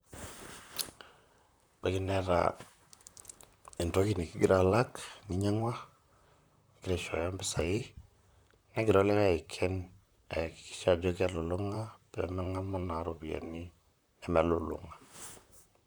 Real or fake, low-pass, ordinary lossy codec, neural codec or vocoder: fake; none; none; vocoder, 44.1 kHz, 128 mel bands, Pupu-Vocoder